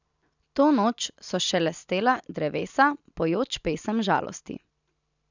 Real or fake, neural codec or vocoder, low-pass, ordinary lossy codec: real; none; 7.2 kHz; none